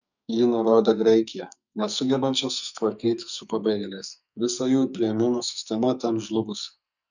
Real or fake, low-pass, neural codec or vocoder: fake; 7.2 kHz; codec, 44.1 kHz, 2.6 kbps, SNAC